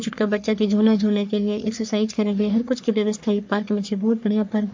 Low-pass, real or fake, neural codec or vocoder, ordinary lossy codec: 7.2 kHz; fake; codec, 44.1 kHz, 3.4 kbps, Pupu-Codec; MP3, 48 kbps